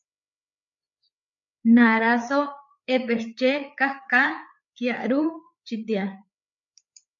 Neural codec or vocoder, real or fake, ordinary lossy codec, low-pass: codec, 16 kHz, 4 kbps, FreqCodec, larger model; fake; MP3, 64 kbps; 7.2 kHz